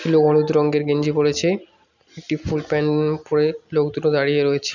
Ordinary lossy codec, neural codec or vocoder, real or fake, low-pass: none; none; real; 7.2 kHz